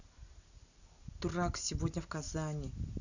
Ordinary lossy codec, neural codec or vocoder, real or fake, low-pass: none; none; real; 7.2 kHz